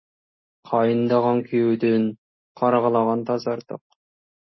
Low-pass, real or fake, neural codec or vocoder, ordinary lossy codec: 7.2 kHz; real; none; MP3, 24 kbps